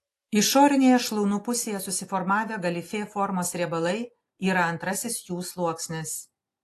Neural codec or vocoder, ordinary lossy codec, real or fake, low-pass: none; AAC, 48 kbps; real; 14.4 kHz